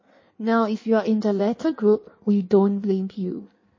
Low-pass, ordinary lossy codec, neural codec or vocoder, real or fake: 7.2 kHz; MP3, 32 kbps; codec, 16 kHz in and 24 kHz out, 1.1 kbps, FireRedTTS-2 codec; fake